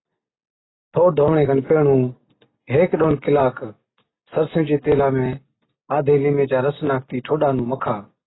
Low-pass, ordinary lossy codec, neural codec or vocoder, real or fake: 7.2 kHz; AAC, 16 kbps; none; real